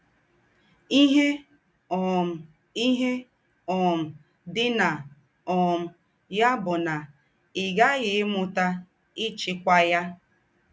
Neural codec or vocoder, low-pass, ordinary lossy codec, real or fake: none; none; none; real